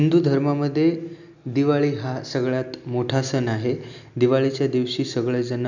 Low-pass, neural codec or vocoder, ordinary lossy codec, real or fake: 7.2 kHz; none; none; real